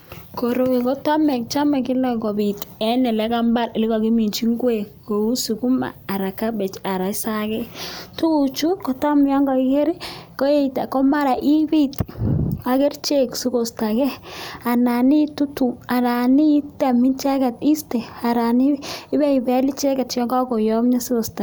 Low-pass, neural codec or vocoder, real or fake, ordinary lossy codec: none; none; real; none